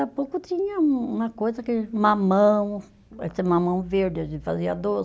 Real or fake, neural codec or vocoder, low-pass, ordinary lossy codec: real; none; none; none